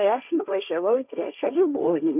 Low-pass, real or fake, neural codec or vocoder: 3.6 kHz; fake; codec, 16 kHz, 1.1 kbps, Voila-Tokenizer